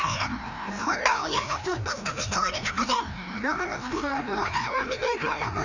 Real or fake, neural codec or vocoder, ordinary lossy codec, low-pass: fake; codec, 16 kHz, 1 kbps, FreqCodec, larger model; none; 7.2 kHz